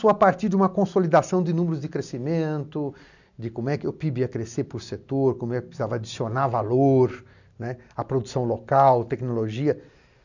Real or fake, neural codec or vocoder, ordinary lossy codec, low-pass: real; none; none; 7.2 kHz